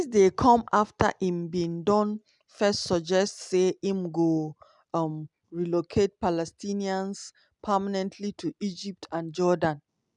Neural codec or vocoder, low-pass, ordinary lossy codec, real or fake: none; 10.8 kHz; none; real